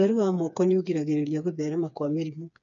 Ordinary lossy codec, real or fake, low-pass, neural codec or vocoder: none; fake; 7.2 kHz; codec, 16 kHz, 4 kbps, FreqCodec, smaller model